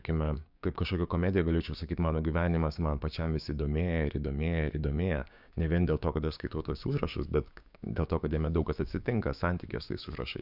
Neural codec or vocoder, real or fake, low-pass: codec, 16 kHz, 6 kbps, DAC; fake; 5.4 kHz